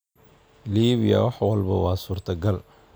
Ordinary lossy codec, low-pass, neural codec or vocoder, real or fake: none; none; none; real